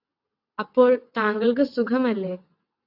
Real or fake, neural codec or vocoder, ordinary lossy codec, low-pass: fake; vocoder, 22.05 kHz, 80 mel bands, WaveNeXt; AAC, 48 kbps; 5.4 kHz